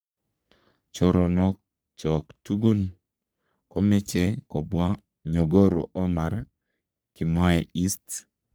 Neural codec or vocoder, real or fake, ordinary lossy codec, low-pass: codec, 44.1 kHz, 3.4 kbps, Pupu-Codec; fake; none; none